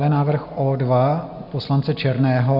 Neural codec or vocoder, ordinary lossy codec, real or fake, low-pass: none; Opus, 64 kbps; real; 5.4 kHz